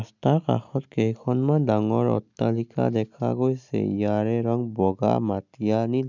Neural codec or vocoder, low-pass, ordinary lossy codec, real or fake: none; 7.2 kHz; none; real